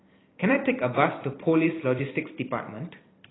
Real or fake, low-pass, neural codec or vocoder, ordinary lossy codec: fake; 7.2 kHz; vocoder, 44.1 kHz, 128 mel bands every 256 samples, BigVGAN v2; AAC, 16 kbps